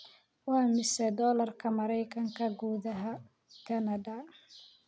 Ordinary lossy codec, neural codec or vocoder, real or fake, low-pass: none; none; real; none